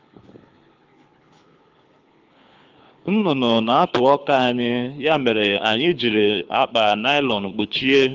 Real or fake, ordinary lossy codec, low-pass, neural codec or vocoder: fake; Opus, 24 kbps; 7.2 kHz; codec, 24 kHz, 6 kbps, HILCodec